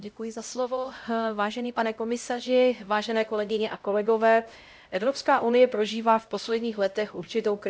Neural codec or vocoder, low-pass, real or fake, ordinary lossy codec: codec, 16 kHz, 0.5 kbps, X-Codec, HuBERT features, trained on LibriSpeech; none; fake; none